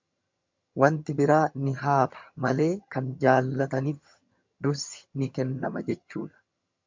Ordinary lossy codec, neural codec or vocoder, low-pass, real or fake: MP3, 64 kbps; vocoder, 22.05 kHz, 80 mel bands, HiFi-GAN; 7.2 kHz; fake